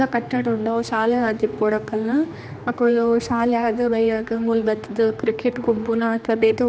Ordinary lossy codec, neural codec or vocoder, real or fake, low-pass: none; codec, 16 kHz, 2 kbps, X-Codec, HuBERT features, trained on general audio; fake; none